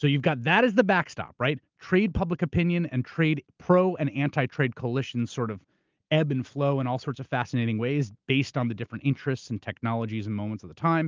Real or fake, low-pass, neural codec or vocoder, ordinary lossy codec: real; 7.2 kHz; none; Opus, 32 kbps